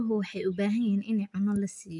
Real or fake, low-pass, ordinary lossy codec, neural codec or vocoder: real; 10.8 kHz; AAC, 64 kbps; none